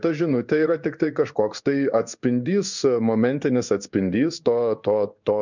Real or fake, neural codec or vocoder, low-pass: fake; codec, 16 kHz in and 24 kHz out, 1 kbps, XY-Tokenizer; 7.2 kHz